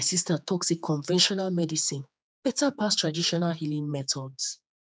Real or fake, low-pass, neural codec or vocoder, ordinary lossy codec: fake; none; codec, 16 kHz, 4 kbps, X-Codec, HuBERT features, trained on general audio; none